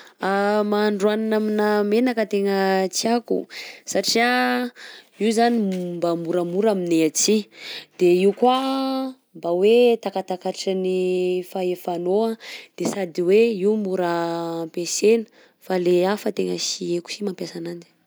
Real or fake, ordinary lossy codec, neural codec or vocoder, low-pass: real; none; none; none